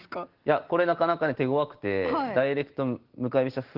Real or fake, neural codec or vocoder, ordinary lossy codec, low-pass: real; none; Opus, 16 kbps; 5.4 kHz